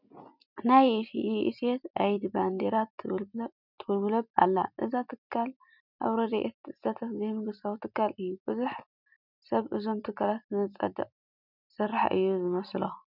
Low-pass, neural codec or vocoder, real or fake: 5.4 kHz; none; real